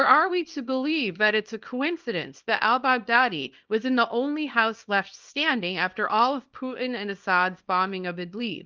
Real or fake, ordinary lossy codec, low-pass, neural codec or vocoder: fake; Opus, 24 kbps; 7.2 kHz; codec, 24 kHz, 0.9 kbps, WavTokenizer, small release